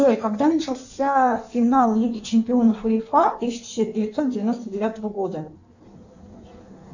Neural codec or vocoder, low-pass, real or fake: codec, 16 kHz in and 24 kHz out, 1.1 kbps, FireRedTTS-2 codec; 7.2 kHz; fake